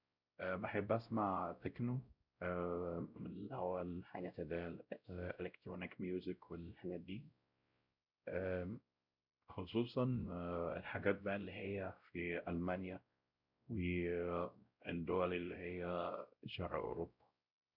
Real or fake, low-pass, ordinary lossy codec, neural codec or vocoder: fake; 5.4 kHz; none; codec, 16 kHz, 0.5 kbps, X-Codec, WavLM features, trained on Multilingual LibriSpeech